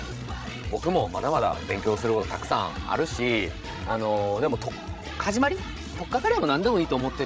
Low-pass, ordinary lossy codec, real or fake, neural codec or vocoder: none; none; fake; codec, 16 kHz, 16 kbps, FreqCodec, larger model